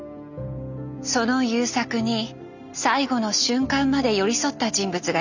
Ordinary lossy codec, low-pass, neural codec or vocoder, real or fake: none; 7.2 kHz; none; real